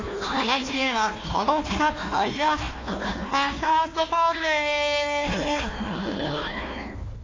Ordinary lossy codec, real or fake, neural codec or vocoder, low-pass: AAC, 32 kbps; fake; codec, 16 kHz, 1 kbps, FunCodec, trained on Chinese and English, 50 frames a second; 7.2 kHz